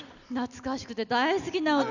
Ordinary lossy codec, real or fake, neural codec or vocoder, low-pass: none; real; none; 7.2 kHz